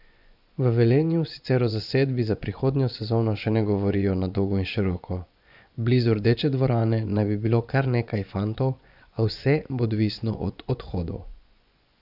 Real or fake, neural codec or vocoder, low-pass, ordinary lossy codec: fake; vocoder, 24 kHz, 100 mel bands, Vocos; 5.4 kHz; none